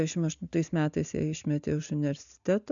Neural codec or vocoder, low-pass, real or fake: none; 7.2 kHz; real